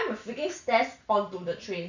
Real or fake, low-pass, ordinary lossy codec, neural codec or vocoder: real; 7.2 kHz; none; none